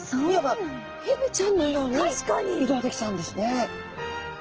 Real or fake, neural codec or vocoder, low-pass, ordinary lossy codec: real; none; 7.2 kHz; Opus, 16 kbps